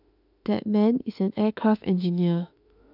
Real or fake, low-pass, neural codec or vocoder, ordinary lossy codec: fake; 5.4 kHz; autoencoder, 48 kHz, 32 numbers a frame, DAC-VAE, trained on Japanese speech; none